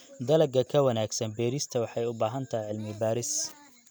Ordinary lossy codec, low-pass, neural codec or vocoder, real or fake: none; none; none; real